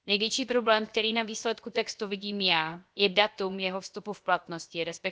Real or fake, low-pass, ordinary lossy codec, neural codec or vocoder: fake; none; none; codec, 16 kHz, 0.7 kbps, FocalCodec